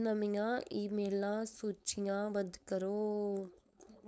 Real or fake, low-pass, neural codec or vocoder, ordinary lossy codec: fake; none; codec, 16 kHz, 4.8 kbps, FACodec; none